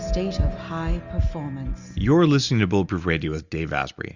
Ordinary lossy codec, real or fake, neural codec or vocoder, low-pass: Opus, 64 kbps; real; none; 7.2 kHz